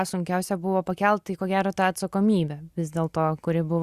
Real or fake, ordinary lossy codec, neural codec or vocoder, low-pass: real; Opus, 64 kbps; none; 14.4 kHz